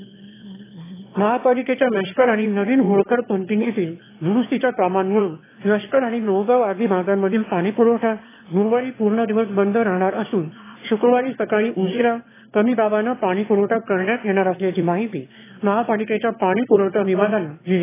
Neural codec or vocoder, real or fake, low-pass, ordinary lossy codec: autoencoder, 22.05 kHz, a latent of 192 numbers a frame, VITS, trained on one speaker; fake; 3.6 kHz; AAC, 16 kbps